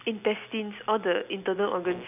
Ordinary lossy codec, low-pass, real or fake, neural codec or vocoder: none; 3.6 kHz; real; none